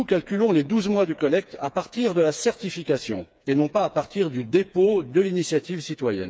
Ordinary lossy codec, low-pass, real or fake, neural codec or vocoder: none; none; fake; codec, 16 kHz, 4 kbps, FreqCodec, smaller model